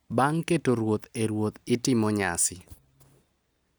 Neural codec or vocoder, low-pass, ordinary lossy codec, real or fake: none; none; none; real